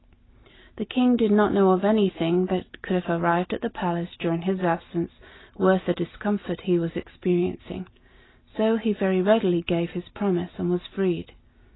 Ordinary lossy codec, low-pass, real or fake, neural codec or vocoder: AAC, 16 kbps; 7.2 kHz; real; none